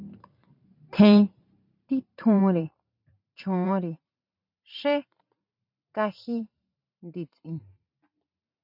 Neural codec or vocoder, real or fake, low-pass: vocoder, 44.1 kHz, 128 mel bands every 512 samples, BigVGAN v2; fake; 5.4 kHz